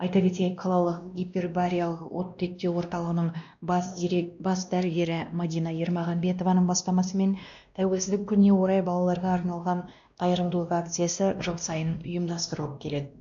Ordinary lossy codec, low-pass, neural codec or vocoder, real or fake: AAC, 64 kbps; 7.2 kHz; codec, 16 kHz, 1 kbps, X-Codec, WavLM features, trained on Multilingual LibriSpeech; fake